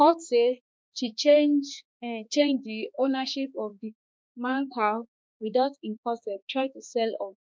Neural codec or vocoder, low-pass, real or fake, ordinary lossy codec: codec, 16 kHz, 2 kbps, X-Codec, HuBERT features, trained on balanced general audio; none; fake; none